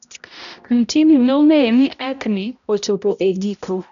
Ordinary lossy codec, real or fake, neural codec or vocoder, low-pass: none; fake; codec, 16 kHz, 0.5 kbps, X-Codec, HuBERT features, trained on balanced general audio; 7.2 kHz